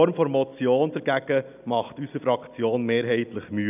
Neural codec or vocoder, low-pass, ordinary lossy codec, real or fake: none; 3.6 kHz; none; real